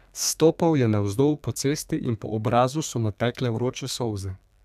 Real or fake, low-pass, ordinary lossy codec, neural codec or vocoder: fake; 14.4 kHz; none; codec, 32 kHz, 1.9 kbps, SNAC